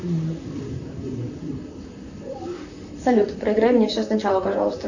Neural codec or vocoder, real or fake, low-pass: vocoder, 44.1 kHz, 128 mel bands, Pupu-Vocoder; fake; 7.2 kHz